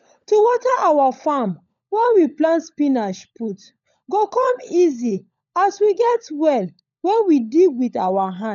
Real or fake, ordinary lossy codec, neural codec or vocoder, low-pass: fake; none; codec, 16 kHz, 16 kbps, FunCodec, trained on LibriTTS, 50 frames a second; 7.2 kHz